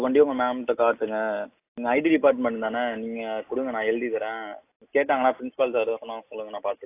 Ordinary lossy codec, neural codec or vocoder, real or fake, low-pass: AAC, 24 kbps; none; real; 3.6 kHz